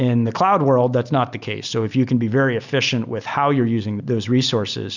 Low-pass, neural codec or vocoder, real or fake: 7.2 kHz; none; real